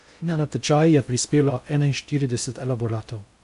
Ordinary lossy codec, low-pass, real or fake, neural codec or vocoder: AAC, 96 kbps; 10.8 kHz; fake; codec, 16 kHz in and 24 kHz out, 0.6 kbps, FocalCodec, streaming, 4096 codes